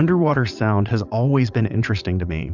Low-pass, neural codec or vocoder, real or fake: 7.2 kHz; vocoder, 22.05 kHz, 80 mel bands, Vocos; fake